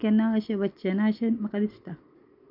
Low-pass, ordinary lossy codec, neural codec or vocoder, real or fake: 5.4 kHz; none; none; real